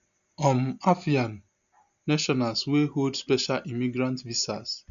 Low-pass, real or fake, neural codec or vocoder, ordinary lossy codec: 7.2 kHz; real; none; none